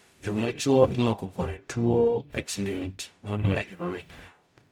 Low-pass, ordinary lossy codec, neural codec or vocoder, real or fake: 19.8 kHz; MP3, 96 kbps; codec, 44.1 kHz, 0.9 kbps, DAC; fake